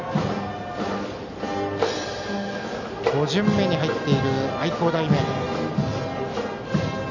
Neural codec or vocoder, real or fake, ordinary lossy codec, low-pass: none; real; none; 7.2 kHz